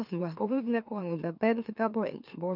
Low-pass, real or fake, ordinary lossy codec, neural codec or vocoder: 5.4 kHz; fake; none; autoencoder, 44.1 kHz, a latent of 192 numbers a frame, MeloTTS